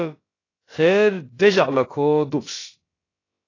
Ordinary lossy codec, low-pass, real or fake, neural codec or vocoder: AAC, 32 kbps; 7.2 kHz; fake; codec, 16 kHz, about 1 kbps, DyCAST, with the encoder's durations